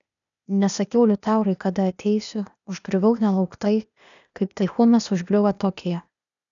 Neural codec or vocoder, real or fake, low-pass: codec, 16 kHz, 0.8 kbps, ZipCodec; fake; 7.2 kHz